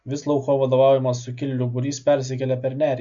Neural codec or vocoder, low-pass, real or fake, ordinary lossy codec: none; 7.2 kHz; real; MP3, 64 kbps